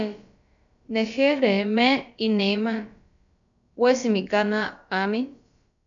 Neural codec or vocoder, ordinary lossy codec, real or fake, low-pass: codec, 16 kHz, about 1 kbps, DyCAST, with the encoder's durations; MP3, 96 kbps; fake; 7.2 kHz